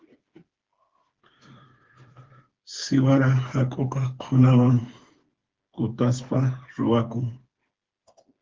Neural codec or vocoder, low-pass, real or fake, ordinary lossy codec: codec, 16 kHz, 4 kbps, FreqCodec, smaller model; 7.2 kHz; fake; Opus, 16 kbps